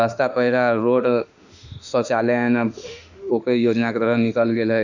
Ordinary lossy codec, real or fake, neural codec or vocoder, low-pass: none; fake; autoencoder, 48 kHz, 32 numbers a frame, DAC-VAE, trained on Japanese speech; 7.2 kHz